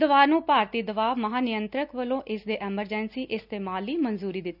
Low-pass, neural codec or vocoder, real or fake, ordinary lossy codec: 5.4 kHz; none; real; none